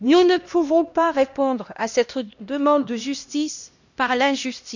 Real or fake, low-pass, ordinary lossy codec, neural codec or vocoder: fake; 7.2 kHz; none; codec, 16 kHz, 1 kbps, X-Codec, HuBERT features, trained on LibriSpeech